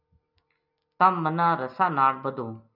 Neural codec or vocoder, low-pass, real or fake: none; 5.4 kHz; real